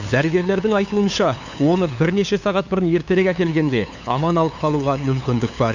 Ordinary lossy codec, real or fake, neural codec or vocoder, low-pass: none; fake; codec, 16 kHz, 2 kbps, FunCodec, trained on LibriTTS, 25 frames a second; 7.2 kHz